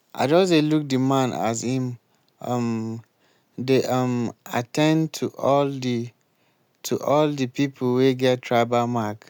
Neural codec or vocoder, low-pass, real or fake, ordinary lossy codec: none; 19.8 kHz; real; none